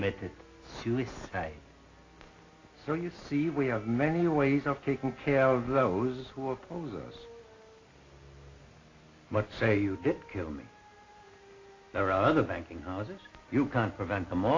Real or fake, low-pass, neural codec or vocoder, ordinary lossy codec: real; 7.2 kHz; none; AAC, 32 kbps